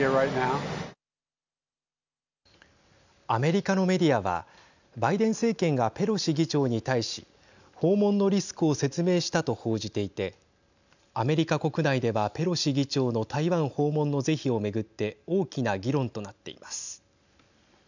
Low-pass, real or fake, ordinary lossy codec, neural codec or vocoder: 7.2 kHz; real; none; none